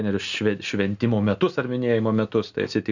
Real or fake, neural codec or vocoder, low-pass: real; none; 7.2 kHz